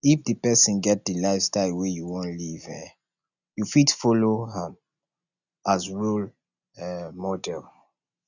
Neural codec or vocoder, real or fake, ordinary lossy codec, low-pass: none; real; none; 7.2 kHz